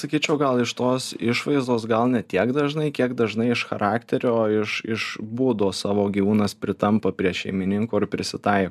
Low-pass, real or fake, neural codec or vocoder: 14.4 kHz; real; none